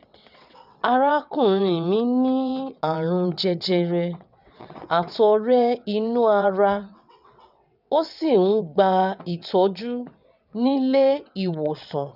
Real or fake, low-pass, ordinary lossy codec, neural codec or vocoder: fake; 5.4 kHz; none; vocoder, 22.05 kHz, 80 mel bands, WaveNeXt